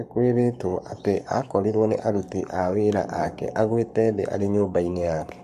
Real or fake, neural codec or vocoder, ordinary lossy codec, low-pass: fake; codec, 44.1 kHz, 7.8 kbps, Pupu-Codec; MP3, 64 kbps; 14.4 kHz